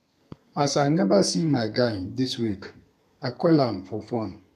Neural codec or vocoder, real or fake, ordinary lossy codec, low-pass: codec, 32 kHz, 1.9 kbps, SNAC; fake; none; 14.4 kHz